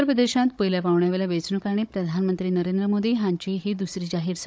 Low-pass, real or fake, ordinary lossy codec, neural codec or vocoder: none; fake; none; codec, 16 kHz, 16 kbps, FunCodec, trained on Chinese and English, 50 frames a second